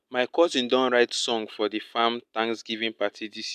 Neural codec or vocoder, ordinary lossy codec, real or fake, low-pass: none; MP3, 96 kbps; real; 14.4 kHz